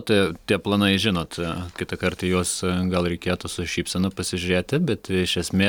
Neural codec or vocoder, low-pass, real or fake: none; 19.8 kHz; real